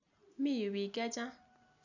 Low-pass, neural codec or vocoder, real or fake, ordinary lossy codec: 7.2 kHz; none; real; none